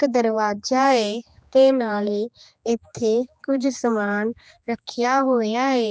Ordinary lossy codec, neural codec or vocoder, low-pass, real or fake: none; codec, 16 kHz, 2 kbps, X-Codec, HuBERT features, trained on general audio; none; fake